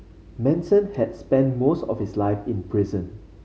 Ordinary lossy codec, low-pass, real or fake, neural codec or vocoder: none; none; real; none